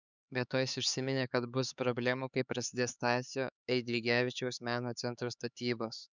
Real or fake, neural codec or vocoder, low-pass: fake; codec, 16 kHz, 4 kbps, X-Codec, HuBERT features, trained on LibriSpeech; 7.2 kHz